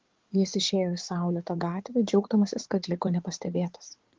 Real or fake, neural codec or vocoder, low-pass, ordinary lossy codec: fake; codec, 16 kHz in and 24 kHz out, 2.2 kbps, FireRedTTS-2 codec; 7.2 kHz; Opus, 16 kbps